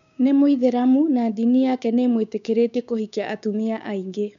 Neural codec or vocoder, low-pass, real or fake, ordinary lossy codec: codec, 16 kHz, 6 kbps, DAC; 7.2 kHz; fake; none